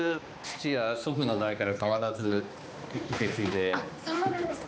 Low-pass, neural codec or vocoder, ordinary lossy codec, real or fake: none; codec, 16 kHz, 2 kbps, X-Codec, HuBERT features, trained on balanced general audio; none; fake